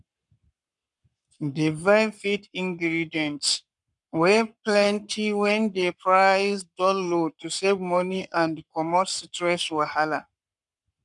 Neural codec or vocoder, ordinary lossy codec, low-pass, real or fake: codec, 44.1 kHz, 7.8 kbps, Pupu-Codec; none; 10.8 kHz; fake